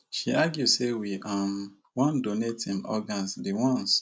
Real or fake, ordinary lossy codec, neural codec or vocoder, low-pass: real; none; none; none